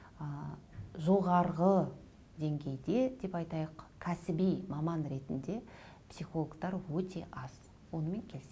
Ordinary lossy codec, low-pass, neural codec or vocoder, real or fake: none; none; none; real